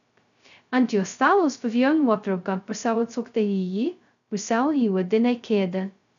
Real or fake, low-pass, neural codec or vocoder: fake; 7.2 kHz; codec, 16 kHz, 0.2 kbps, FocalCodec